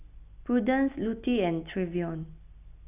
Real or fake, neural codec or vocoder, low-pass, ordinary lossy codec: real; none; 3.6 kHz; none